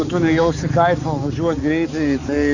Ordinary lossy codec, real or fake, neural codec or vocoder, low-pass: Opus, 64 kbps; fake; codec, 16 kHz, 4 kbps, X-Codec, HuBERT features, trained on balanced general audio; 7.2 kHz